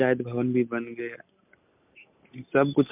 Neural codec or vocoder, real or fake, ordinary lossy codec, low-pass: none; real; MP3, 32 kbps; 3.6 kHz